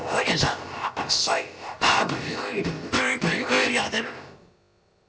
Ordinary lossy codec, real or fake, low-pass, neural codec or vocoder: none; fake; none; codec, 16 kHz, about 1 kbps, DyCAST, with the encoder's durations